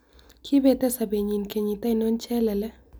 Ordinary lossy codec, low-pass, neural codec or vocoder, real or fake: none; none; none; real